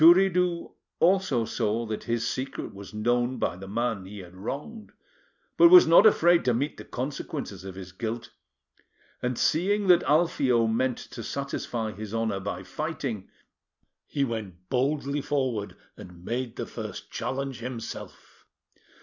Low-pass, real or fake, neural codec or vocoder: 7.2 kHz; real; none